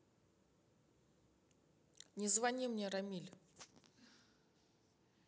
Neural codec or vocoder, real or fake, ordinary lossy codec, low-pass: none; real; none; none